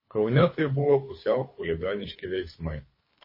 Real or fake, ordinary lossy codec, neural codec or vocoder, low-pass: fake; MP3, 24 kbps; codec, 24 kHz, 3 kbps, HILCodec; 5.4 kHz